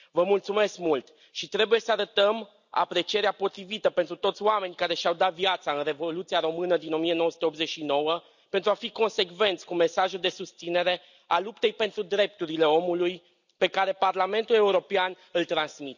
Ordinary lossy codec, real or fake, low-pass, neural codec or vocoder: none; real; 7.2 kHz; none